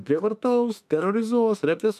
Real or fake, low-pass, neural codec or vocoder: fake; 14.4 kHz; autoencoder, 48 kHz, 32 numbers a frame, DAC-VAE, trained on Japanese speech